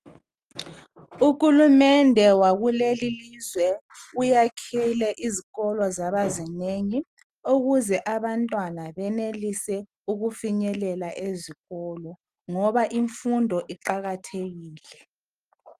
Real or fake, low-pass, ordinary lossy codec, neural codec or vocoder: real; 14.4 kHz; Opus, 32 kbps; none